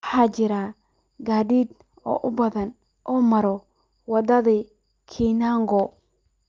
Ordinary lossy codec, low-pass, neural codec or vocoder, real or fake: Opus, 24 kbps; 7.2 kHz; none; real